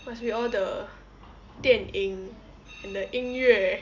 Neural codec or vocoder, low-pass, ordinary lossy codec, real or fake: none; 7.2 kHz; none; real